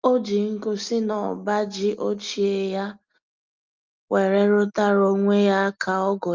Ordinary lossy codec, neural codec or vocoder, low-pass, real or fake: Opus, 32 kbps; none; 7.2 kHz; real